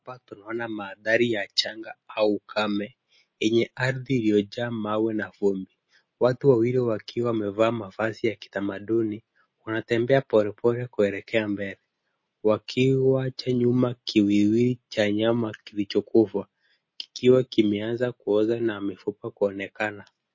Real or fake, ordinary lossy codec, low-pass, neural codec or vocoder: real; MP3, 32 kbps; 7.2 kHz; none